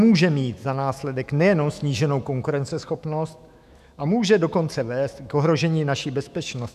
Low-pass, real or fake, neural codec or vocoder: 14.4 kHz; fake; autoencoder, 48 kHz, 128 numbers a frame, DAC-VAE, trained on Japanese speech